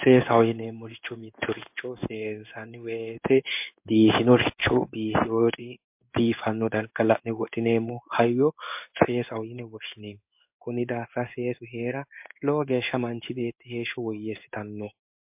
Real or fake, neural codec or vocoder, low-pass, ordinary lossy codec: fake; codec, 16 kHz in and 24 kHz out, 1 kbps, XY-Tokenizer; 3.6 kHz; MP3, 32 kbps